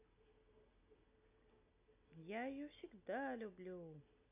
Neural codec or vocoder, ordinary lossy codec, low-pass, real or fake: none; none; 3.6 kHz; real